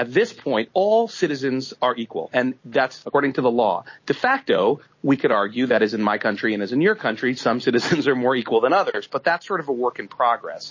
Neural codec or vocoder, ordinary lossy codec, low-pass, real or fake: none; MP3, 32 kbps; 7.2 kHz; real